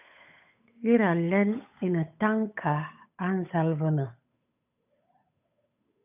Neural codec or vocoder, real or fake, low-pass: codec, 16 kHz, 8 kbps, FunCodec, trained on Chinese and English, 25 frames a second; fake; 3.6 kHz